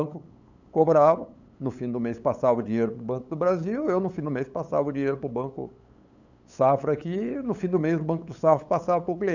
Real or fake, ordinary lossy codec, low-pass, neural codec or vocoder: fake; none; 7.2 kHz; codec, 16 kHz, 8 kbps, FunCodec, trained on LibriTTS, 25 frames a second